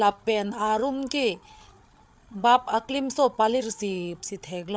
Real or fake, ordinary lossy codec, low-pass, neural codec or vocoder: fake; none; none; codec, 16 kHz, 8 kbps, FreqCodec, larger model